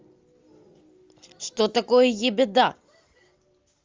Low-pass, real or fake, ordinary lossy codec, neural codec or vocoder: 7.2 kHz; real; Opus, 32 kbps; none